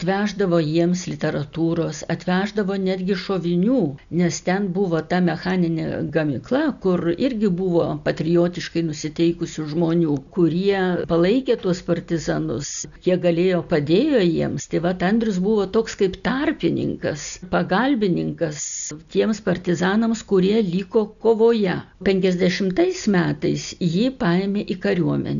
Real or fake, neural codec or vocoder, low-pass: real; none; 7.2 kHz